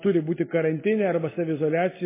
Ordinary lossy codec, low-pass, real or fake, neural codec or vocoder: MP3, 16 kbps; 3.6 kHz; real; none